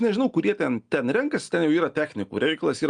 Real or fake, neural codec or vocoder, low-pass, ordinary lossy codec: real; none; 9.9 kHz; Opus, 32 kbps